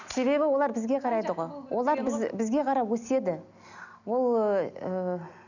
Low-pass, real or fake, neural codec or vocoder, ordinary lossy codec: 7.2 kHz; real; none; none